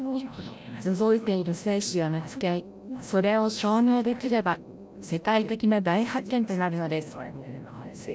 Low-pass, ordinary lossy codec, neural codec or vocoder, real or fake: none; none; codec, 16 kHz, 0.5 kbps, FreqCodec, larger model; fake